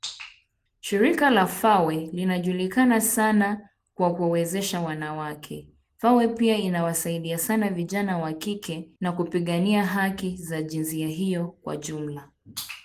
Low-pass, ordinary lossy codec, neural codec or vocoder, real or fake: 14.4 kHz; Opus, 24 kbps; none; real